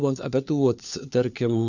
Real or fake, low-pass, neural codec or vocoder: fake; 7.2 kHz; codec, 16 kHz, 4 kbps, FunCodec, trained on LibriTTS, 50 frames a second